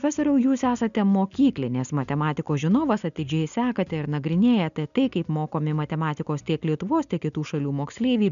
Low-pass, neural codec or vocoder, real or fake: 7.2 kHz; none; real